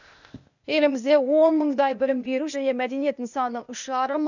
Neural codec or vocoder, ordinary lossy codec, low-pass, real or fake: codec, 16 kHz, 0.8 kbps, ZipCodec; none; 7.2 kHz; fake